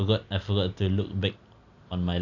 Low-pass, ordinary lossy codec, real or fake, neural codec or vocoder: 7.2 kHz; none; real; none